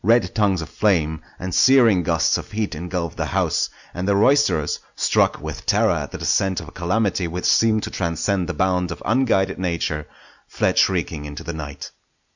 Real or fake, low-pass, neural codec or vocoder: real; 7.2 kHz; none